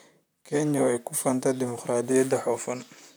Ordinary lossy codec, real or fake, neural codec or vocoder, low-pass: none; fake; vocoder, 44.1 kHz, 128 mel bands, Pupu-Vocoder; none